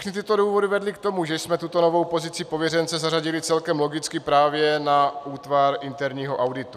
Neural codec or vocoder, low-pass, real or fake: none; 14.4 kHz; real